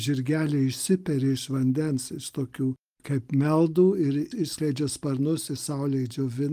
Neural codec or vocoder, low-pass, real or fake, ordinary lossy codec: none; 14.4 kHz; real; Opus, 32 kbps